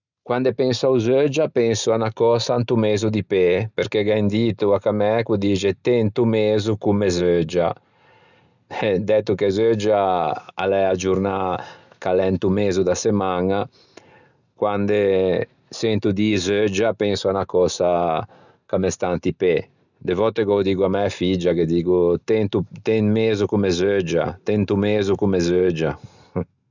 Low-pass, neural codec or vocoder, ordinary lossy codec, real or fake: 7.2 kHz; none; none; real